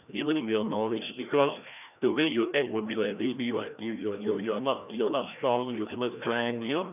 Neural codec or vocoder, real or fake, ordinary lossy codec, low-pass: codec, 16 kHz, 1 kbps, FreqCodec, larger model; fake; none; 3.6 kHz